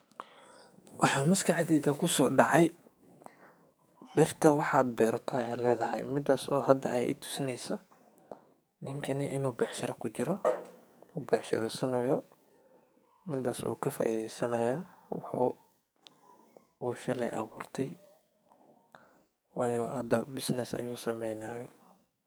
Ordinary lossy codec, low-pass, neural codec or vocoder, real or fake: none; none; codec, 44.1 kHz, 2.6 kbps, SNAC; fake